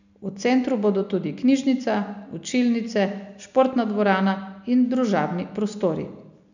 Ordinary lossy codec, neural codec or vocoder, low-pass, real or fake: none; none; 7.2 kHz; real